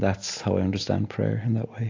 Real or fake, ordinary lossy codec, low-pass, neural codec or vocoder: real; AAC, 48 kbps; 7.2 kHz; none